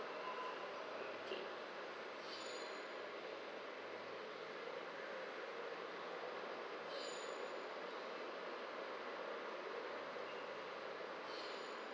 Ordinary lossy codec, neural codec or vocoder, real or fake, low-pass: none; none; real; none